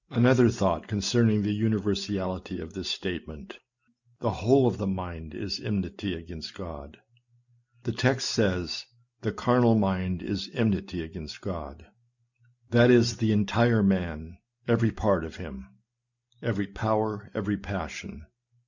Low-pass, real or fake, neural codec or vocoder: 7.2 kHz; real; none